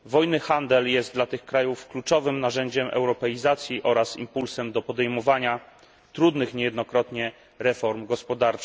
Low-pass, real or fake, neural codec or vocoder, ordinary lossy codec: none; real; none; none